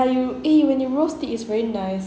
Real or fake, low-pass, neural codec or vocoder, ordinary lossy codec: real; none; none; none